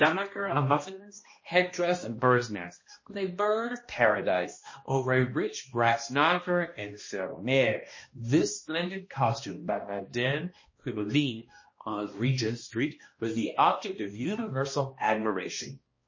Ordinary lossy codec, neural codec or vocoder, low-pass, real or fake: MP3, 32 kbps; codec, 16 kHz, 1 kbps, X-Codec, HuBERT features, trained on balanced general audio; 7.2 kHz; fake